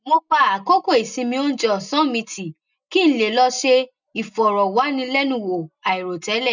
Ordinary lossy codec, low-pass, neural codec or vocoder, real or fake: none; 7.2 kHz; none; real